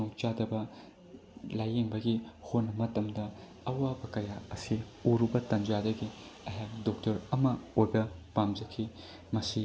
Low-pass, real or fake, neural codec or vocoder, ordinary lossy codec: none; real; none; none